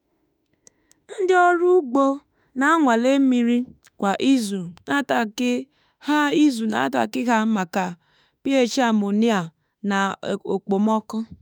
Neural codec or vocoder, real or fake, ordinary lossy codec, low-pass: autoencoder, 48 kHz, 32 numbers a frame, DAC-VAE, trained on Japanese speech; fake; none; none